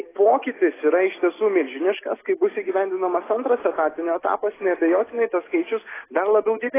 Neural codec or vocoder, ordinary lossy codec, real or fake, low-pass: none; AAC, 16 kbps; real; 3.6 kHz